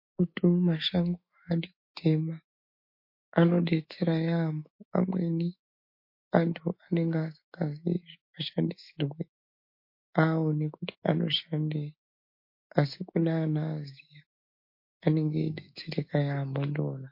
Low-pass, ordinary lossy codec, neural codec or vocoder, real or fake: 5.4 kHz; MP3, 32 kbps; autoencoder, 48 kHz, 128 numbers a frame, DAC-VAE, trained on Japanese speech; fake